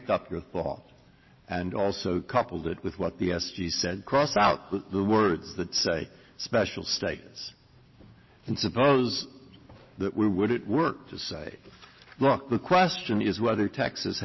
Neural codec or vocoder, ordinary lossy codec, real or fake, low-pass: none; MP3, 24 kbps; real; 7.2 kHz